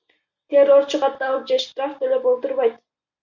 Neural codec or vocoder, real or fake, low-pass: none; real; 7.2 kHz